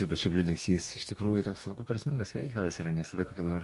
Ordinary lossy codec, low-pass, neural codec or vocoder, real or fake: MP3, 48 kbps; 14.4 kHz; codec, 44.1 kHz, 2.6 kbps, DAC; fake